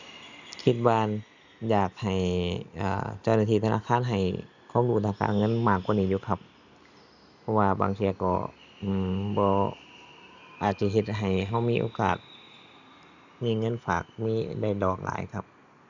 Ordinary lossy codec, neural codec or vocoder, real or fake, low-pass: none; none; real; 7.2 kHz